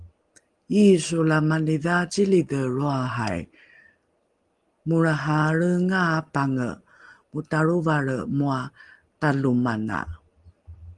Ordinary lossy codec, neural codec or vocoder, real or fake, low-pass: Opus, 24 kbps; none; real; 10.8 kHz